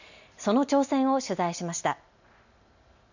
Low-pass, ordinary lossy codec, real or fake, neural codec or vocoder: 7.2 kHz; none; real; none